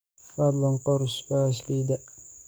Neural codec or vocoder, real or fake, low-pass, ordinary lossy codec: codec, 44.1 kHz, 7.8 kbps, DAC; fake; none; none